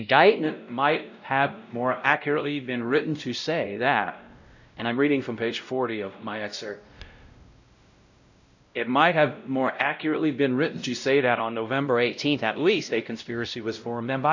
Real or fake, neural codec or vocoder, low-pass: fake; codec, 16 kHz, 0.5 kbps, X-Codec, WavLM features, trained on Multilingual LibriSpeech; 7.2 kHz